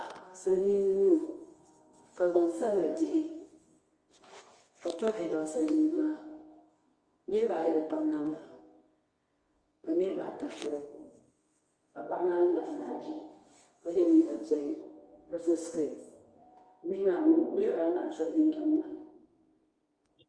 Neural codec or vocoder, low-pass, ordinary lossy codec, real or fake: codec, 24 kHz, 0.9 kbps, WavTokenizer, medium music audio release; 9.9 kHz; Opus, 32 kbps; fake